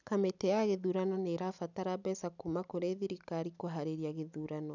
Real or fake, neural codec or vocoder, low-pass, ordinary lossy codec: real; none; 7.2 kHz; none